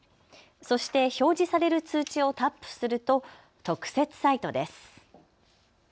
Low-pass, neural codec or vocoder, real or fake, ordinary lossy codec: none; none; real; none